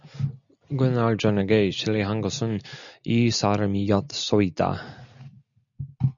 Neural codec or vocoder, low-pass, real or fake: none; 7.2 kHz; real